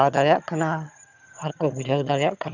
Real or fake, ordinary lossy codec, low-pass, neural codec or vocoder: fake; none; 7.2 kHz; vocoder, 22.05 kHz, 80 mel bands, HiFi-GAN